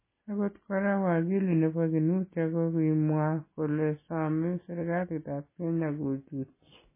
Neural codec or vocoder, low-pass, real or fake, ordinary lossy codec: none; 3.6 kHz; real; MP3, 16 kbps